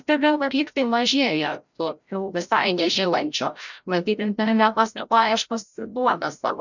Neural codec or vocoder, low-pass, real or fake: codec, 16 kHz, 0.5 kbps, FreqCodec, larger model; 7.2 kHz; fake